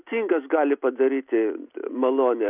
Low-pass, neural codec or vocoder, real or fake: 3.6 kHz; none; real